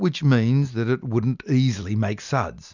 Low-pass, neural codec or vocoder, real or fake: 7.2 kHz; none; real